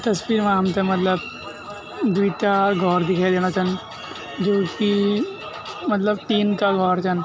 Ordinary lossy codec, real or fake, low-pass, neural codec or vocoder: none; real; none; none